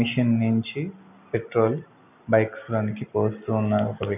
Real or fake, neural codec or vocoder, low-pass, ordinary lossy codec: real; none; 3.6 kHz; none